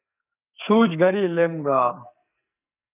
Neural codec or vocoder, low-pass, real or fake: codec, 44.1 kHz, 2.6 kbps, SNAC; 3.6 kHz; fake